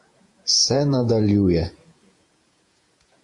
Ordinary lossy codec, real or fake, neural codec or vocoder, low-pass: AAC, 64 kbps; fake; vocoder, 48 kHz, 128 mel bands, Vocos; 10.8 kHz